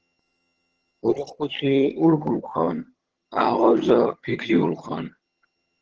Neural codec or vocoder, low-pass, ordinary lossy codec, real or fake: vocoder, 22.05 kHz, 80 mel bands, HiFi-GAN; 7.2 kHz; Opus, 16 kbps; fake